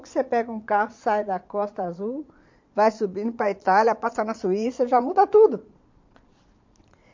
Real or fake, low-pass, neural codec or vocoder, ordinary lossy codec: fake; 7.2 kHz; vocoder, 22.05 kHz, 80 mel bands, Vocos; MP3, 48 kbps